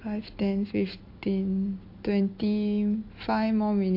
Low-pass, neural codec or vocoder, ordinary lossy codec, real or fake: 5.4 kHz; none; MP3, 32 kbps; real